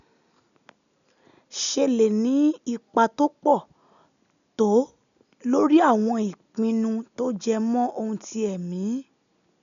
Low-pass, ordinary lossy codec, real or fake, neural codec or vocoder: 7.2 kHz; none; real; none